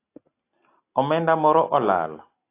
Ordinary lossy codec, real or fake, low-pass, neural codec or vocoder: AAC, 24 kbps; real; 3.6 kHz; none